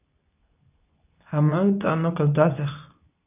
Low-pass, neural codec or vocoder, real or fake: 3.6 kHz; codec, 24 kHz, 0.9 kbps, WavTokenizer, medium speech release version 2; fake